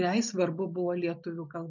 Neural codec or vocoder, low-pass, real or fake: none; 7.2 kHz; real